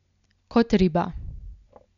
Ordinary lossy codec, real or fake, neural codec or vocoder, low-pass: none; real; none; 7.2 kHz